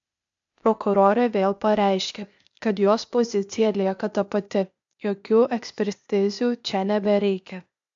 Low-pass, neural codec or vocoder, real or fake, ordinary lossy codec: 7.2 kHz; codec, 16 kHz, 0.8 kbps, ZipCodec; fake; AAC, 64 kbps